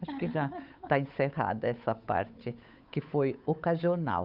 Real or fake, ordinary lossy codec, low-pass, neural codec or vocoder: fake; none; 5.4 kHz; codec, 16 kHz, 8 kbps, FunCodec, trained on Chinese and English, 25 frames a second